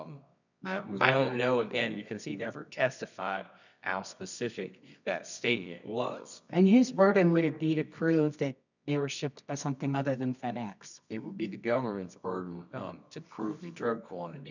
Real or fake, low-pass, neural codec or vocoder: fake; 7.2 kHz; codec, 24 kHz, 0.9 kbps, WavTokenizer, medium music audio release